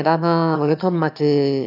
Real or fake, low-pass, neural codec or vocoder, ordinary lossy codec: fake; 5.4 kHz; autoencoder, 22.05 kHz, a latent of 192 numbers a frame, VITS, trained on one speaker; none